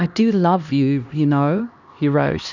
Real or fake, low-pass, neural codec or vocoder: fake; 7.2 kHz; codec, 16 kHz, 1 kbps, X-Codec, HuBERT features, trained on LibriSpeech